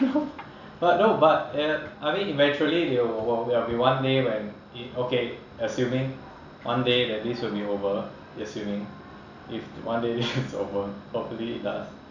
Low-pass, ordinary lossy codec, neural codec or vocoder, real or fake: 7.2 kHz; none; none; real